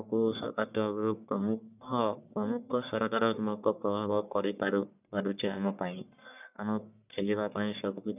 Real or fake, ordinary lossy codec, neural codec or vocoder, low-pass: fake; none; codec, 44.1 kHz, 1.7 kbps, Pupu-Codec; 3.6 kHz